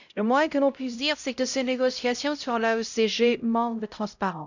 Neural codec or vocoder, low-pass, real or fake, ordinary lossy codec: codec, 16 kHz, 0.5 kbps, X-Codec, HuBERT features, trained on LibriSpeech; 7.2 kHz; fake; none